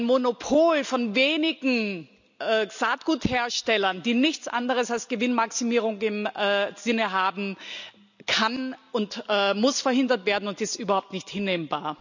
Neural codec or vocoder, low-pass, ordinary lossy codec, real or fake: none; 7.2 kHz; none; real